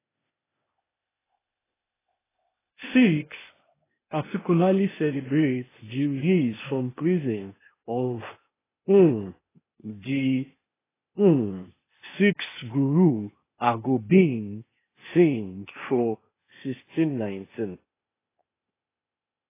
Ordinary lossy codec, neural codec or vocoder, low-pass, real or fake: AAC, 16 kbps; codec, 16 kHz, 0.8 kbps, ZipCodec; 3.6 kHz; fake